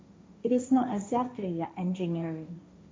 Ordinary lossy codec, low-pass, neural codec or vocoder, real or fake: none; none; codec, 16 kHz, 1.1 kbps, Voila-Tokenizer; fake